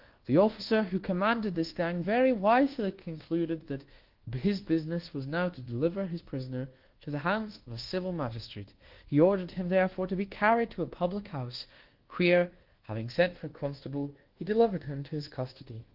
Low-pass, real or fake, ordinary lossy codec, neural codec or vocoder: 5.4 kHz; fake; Opus, 16 kbps; codec, 24 kHz, 1.2 kbps, DualCodec